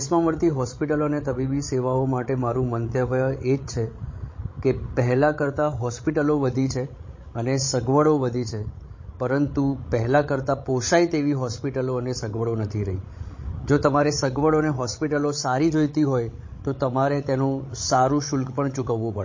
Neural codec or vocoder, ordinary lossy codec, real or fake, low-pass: codec, 16 kHz, 16 kbps, FreqCodec, larger model; MP3, 32 kbps; fake; 7.2 kHz